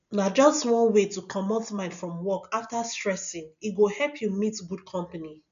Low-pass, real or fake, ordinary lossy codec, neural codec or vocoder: 7.2 kHz; real; none; none